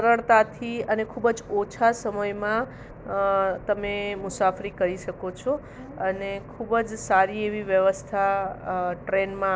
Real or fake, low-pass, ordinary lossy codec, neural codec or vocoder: real; none; none; none